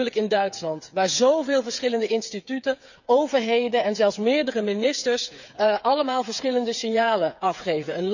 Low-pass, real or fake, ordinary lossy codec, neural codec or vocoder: 7.2 kHz; fake; none; codec, 16 kHz, 8 kbps, FreqCodec, smaller model